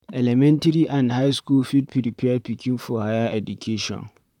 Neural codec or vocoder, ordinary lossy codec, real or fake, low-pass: vocoder, 44.1 kHz, 128 mel bands, Pupu-Vocoder; none; fake; 19.8 kHz